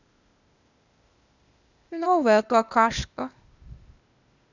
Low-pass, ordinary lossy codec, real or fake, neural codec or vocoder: 7.2 kHz; none; fake; codec, 16 kHz, 0.8 kbps, ZipCodec